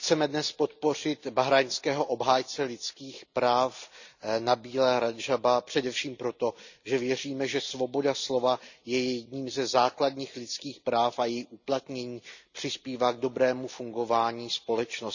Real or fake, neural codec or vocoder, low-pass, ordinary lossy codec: real; none; 7.2 kHz; none